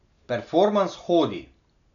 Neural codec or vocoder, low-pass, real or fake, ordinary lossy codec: none; 7.2 kHz; real; none